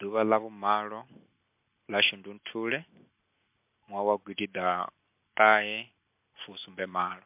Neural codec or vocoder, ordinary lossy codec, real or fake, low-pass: none; MP3, 32 kbps; real; 3.6 kHz